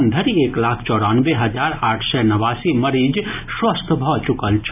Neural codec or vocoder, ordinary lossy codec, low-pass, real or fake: none; none; 3.6 kHz; real